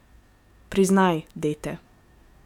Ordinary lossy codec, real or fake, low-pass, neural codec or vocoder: none; fake; 19.8 kHz; autoencoder, 48 kHz, 128 numbers a frame, DAC-VAE, trained on Japanese speech